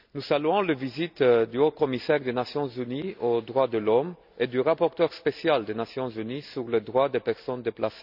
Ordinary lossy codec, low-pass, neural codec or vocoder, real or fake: none; 5.4 kHz; none; real